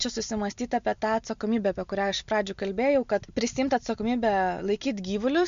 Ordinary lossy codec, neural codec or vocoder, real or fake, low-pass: MP3, 64 kbps; none; real; 7.2 kHz